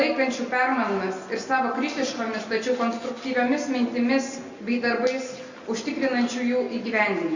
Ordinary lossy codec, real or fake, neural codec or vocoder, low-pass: Opus, 64 kbps; real; none; 7.2 kHz